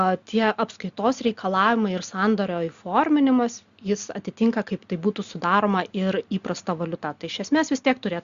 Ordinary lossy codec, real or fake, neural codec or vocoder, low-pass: Opus, 64 kbps; real; none; 7.2 kHz